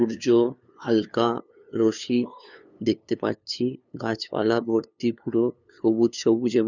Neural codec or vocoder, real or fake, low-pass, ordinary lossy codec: codec, 16 kHz, 2 kbps, FunCodec, trained on LibriTTS, 25 frames a second; fake; 7.2 kHz; none